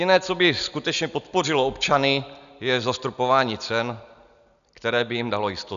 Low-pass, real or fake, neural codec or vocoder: 7.2 kHz; real; none